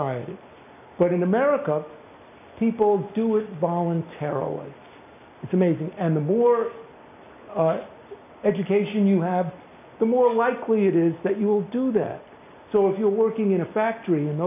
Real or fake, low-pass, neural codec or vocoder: real; 3.6 kHz; none